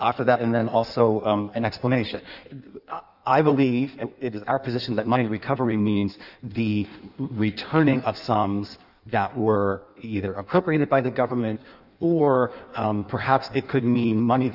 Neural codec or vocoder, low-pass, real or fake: codec, 16 kHz in and 24 kHz out, 1.1 kbps, FireRedTTS-2 codec; 5.4 kHz; fake